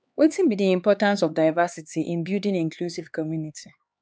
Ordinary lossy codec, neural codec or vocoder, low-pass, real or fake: none; codec, 16 kHz, 4 kbps, X-Codec, HuBERT features, trained on LibriSpeech; none; fake